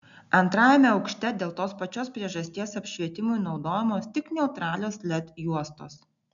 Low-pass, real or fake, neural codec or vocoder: 7.2 kHz; real; none